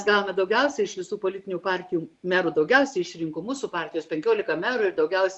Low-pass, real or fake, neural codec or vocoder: 10.8 kHz; real; none